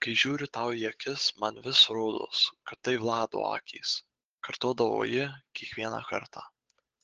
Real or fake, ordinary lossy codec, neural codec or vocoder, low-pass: fake; Opus, 16 kbps; codec, 16 kHz, 8 kbps, FunCodec, trained on Chinese and English, 25 frames a second; 7.2 kHz